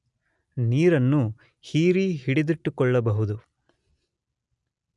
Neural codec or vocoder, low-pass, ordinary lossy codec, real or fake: none; 10.8 kHz; none; real